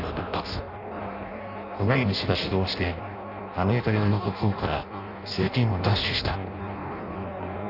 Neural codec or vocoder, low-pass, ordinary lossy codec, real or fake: codec, 16 kHz in and 24 kHz out, 0.6 kbps, FireRedTTS-2 codec; 5.4 kHz; none; fake